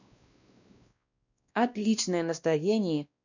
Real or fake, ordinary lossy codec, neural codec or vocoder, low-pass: fake; none; codec, 16 kHz, 1 kbps, X-Codec, WavLM features, trained on Multilingual LibriSpeech; 7.2 kHz